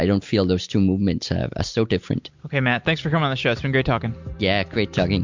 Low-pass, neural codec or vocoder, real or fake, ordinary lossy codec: 7.2 kHz; none; real; MP3, 64 kbps